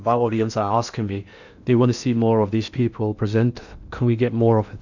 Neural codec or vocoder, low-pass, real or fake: codec, 16 kHz in and 24 kHz out, 0.6 kbps, FocalCodec, streaming, 2048 codes; 7.2 kHz; fake